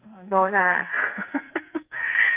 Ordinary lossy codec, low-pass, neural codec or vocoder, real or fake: Opus, 24 kbps; 3.6 kHz; codec, 44.1 kHz, 2.6 kbps, SNAC; fake